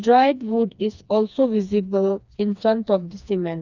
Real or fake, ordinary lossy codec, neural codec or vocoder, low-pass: fake; none; codec, 16 kHz, 2 kbps, FreqCodec, smaller model; 7.2 kHz